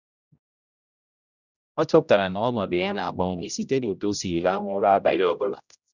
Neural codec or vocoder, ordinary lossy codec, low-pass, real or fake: codec, 16 kHz, 0.5 kbps, X-Codec, HuBERT features, trained on general audio; none; 7.2 kHz; fake